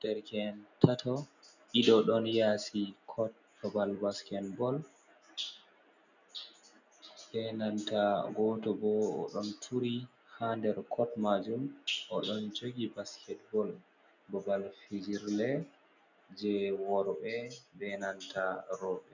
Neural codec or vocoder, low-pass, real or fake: none; 7.2 kHz; real